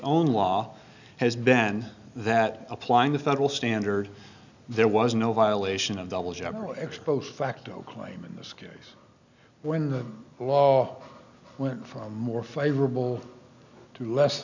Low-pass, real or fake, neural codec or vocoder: 7.2 kHz; real; none